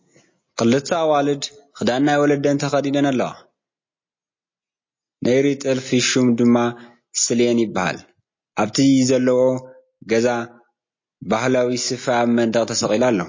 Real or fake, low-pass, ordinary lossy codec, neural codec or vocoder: real; 7.2 kHz; MP3, 32 kbps; none